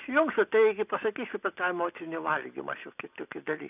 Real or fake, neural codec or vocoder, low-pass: real; none; 3.6 kHz